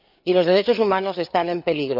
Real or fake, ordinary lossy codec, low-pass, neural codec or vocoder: fake; none; 5.4 kHz; codec, 16 kHz, 4 kbps, FreqCodec, larger model